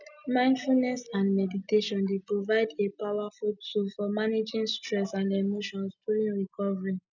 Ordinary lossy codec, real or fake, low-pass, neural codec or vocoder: none; real; 7.2 kHz; none